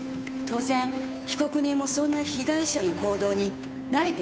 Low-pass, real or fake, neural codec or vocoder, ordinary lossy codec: none; fake; codec, 16 kHz, 2 kbps, FunCodec, trained on Chinese and English, 25 frames a second; none